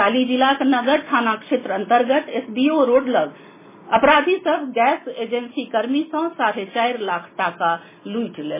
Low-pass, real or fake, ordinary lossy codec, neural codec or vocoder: 3.6 kHz; real; MP3, 16 kbps; none